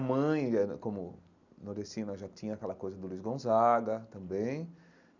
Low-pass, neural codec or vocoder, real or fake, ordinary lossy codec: 7.2 kHz; none; real; none